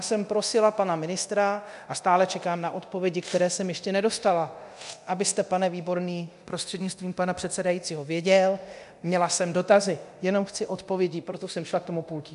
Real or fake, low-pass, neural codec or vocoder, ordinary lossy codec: fake; 10.8 kHz; codec, 24 kHz, 0.9 kbps, DualCodec; MP3, 96 kbps